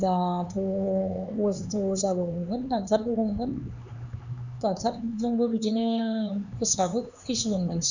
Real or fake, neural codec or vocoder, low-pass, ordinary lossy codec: fake; codec, 16 kHz, 4 kbps, X-Codec, HuBERT features, trained on LibriSpeech; 7.2 kHz; none